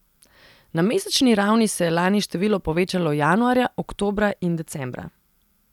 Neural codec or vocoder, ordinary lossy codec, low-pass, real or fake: vocoder, 44.1 kHz, 128 mel bands every 256 samples, BigVGAN v2; none; 19.8 kHz; fake